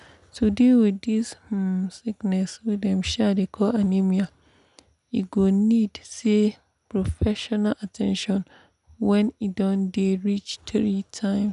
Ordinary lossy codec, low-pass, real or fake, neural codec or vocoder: none; 10.8 kHz; real; none